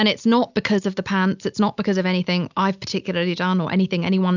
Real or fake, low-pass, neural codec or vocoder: real; 7.2 kHz; none